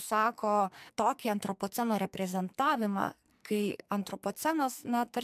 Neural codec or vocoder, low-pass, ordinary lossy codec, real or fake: codec, 44.1 kHz, 2.6 kbps, SNAC; 14.4 kHz; MP3, 96 kbps; fake